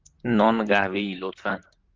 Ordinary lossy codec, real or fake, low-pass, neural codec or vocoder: Opus, 32 kbps; fake; 7.2 kHz; vocoder, 44.1 kHz, 128 mel bands, Pupu-Vocoder